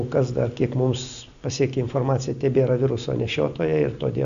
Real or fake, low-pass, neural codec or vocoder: real; 7.2 kHz; none